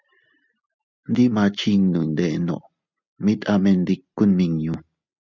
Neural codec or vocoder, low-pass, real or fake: none; 7.2 kHz; real